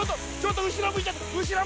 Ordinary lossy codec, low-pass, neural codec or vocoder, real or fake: none; none; none; real